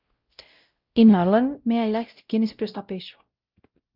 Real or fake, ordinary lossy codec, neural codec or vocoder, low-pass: fake; Opus, 24 kbps; codec, 16 kHz, 0.5 kbps, X-Codec, WavLM features, trained on Multilingual LibriSpeech; 5.4 kHz